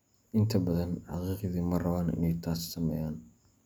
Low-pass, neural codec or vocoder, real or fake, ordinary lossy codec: none; none; real; none